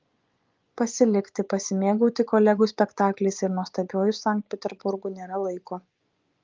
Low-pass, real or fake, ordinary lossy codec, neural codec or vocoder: 7.2 kHz; fake; Opus, 24 kbps; vocoder, 24 kHz, 100 mel bands, Vocos